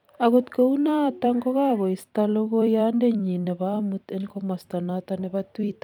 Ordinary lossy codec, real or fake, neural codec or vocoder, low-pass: none; fake; vocoder, 44.1 kHz, 128 mel bands every 256 samples, BigVGAN v2; 19.8 kHz